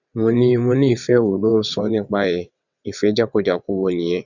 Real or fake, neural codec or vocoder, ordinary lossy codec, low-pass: fake; vocoder, 22.05 kHz, 80 mel bands, WaveNeXt; none; 7.2 kHz